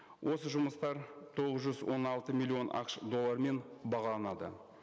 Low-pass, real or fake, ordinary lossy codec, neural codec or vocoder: none; real; none; none